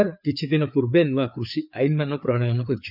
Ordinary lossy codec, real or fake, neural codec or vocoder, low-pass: none; fake; codec, 16 kHz, 4 kbps, FreqCodec, larger model; 5.4 kHz